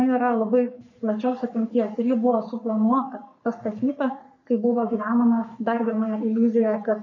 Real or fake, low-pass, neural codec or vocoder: fake; 7.2 kHz; codec, 44.1 kHz, 3.4 kbps, Pupu-Codec